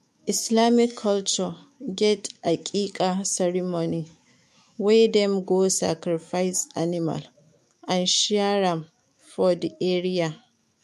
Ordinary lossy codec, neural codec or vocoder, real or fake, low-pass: MP3, 64 kbps; autoencoder, 48 kHz, 128 numbers a frame, DAC-VAE, trained on Japanese speech; fake; 14.4 kHz